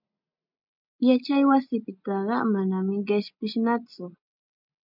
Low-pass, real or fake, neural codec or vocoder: 5.4 kHz; real; none